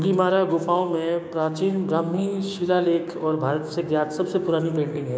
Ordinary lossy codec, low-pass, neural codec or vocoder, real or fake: none; none; codec, 16 kHz, 6 kbps, DAC; fake